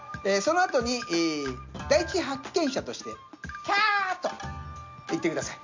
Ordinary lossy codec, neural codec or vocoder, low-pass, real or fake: MP3, 64 kbps; none; 7.2 kHz; real